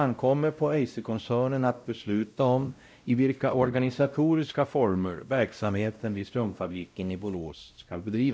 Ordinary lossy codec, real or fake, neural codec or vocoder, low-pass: none; fake; codec, 16 kHz, 0.5 kbps, X-Codec, WavLM features, trained on Multilingual LibriSpeech; none